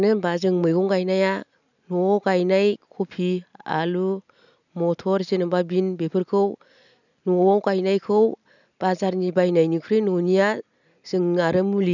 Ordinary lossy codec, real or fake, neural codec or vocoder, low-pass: none; real; none; 7.2 kHz